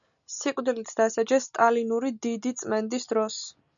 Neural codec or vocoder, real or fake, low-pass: none; real; 7.2 kHz